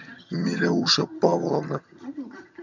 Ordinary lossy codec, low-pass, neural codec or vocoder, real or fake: MP3, 64 kbps; 7.2 kHz; vocoder, 22.05 kHz, 80 mel bands, HiFi-GAN; fake